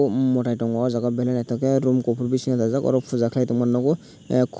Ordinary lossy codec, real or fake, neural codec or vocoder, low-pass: none; real; none; none